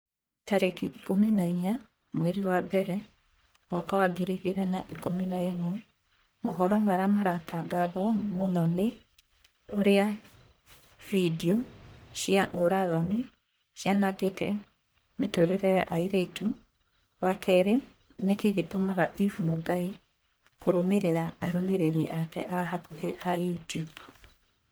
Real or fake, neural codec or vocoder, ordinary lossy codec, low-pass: fake; codec, 44.1 kHz, 1.7 kbps, Pupu-Codec; none; none